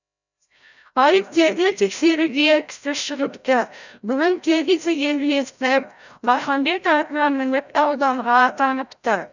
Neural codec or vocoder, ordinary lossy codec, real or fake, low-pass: codec, 16 kHz, 0.5 kbps, FreqCodec, larger model; none; fake; 7.2 kHz